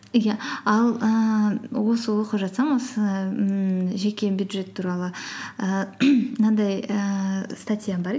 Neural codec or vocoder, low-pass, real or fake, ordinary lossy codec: none; none; real; none